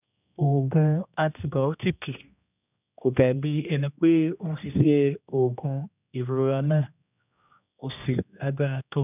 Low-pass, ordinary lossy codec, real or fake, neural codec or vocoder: 3.6 kHz; none; fake; codec, 16 kHz, 1 kbps, X-Codec, HuBERT features, trained on general audio